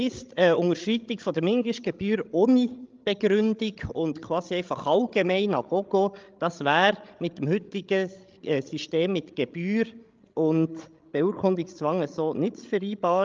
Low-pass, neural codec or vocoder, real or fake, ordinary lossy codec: 7.2 kHz; codec, 16 kHz, 16 kbps, FreqCodec, larger model; fake; Opus, 24 kbps